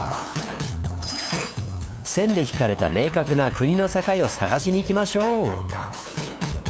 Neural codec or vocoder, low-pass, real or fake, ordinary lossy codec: codec, 16 kHz, 2 kbps, FunCodec, trained on LibriTTS, 25 frames a second; none; fake; none